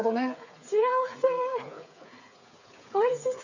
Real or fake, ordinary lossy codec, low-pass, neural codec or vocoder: fake; none; 7.2 kHz; codec, 16 kHz, 8 kbps, FreqCodec, smaller model